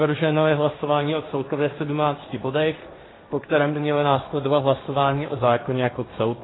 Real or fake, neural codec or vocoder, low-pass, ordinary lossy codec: fake; codec, 16 kHz, 1.1 kbps, Voila-Tokenizer; 7.2 kHz; AAC, 16 kbps